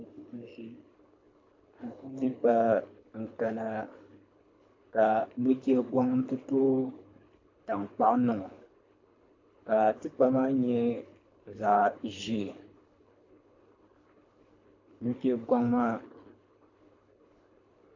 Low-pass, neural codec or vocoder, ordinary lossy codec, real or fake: 7.2 kHz; codec, 24 kHz, 3 kbps, HILCodec; AAC, 48 kbps; fake